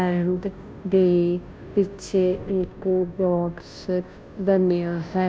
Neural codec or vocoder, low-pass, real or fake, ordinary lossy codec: codec, 16 kHz, 0.5 kbps, FunCodec, trained on Chinese and English, 25 frames a second; none; fake; none